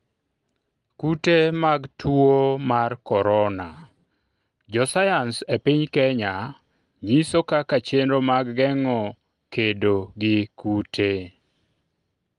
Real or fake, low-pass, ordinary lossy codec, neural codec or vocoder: real; 10.8 kHz; Opus, 32 kbps; none